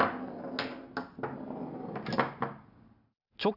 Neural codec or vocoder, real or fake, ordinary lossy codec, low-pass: none; real; none; 5.4 kHz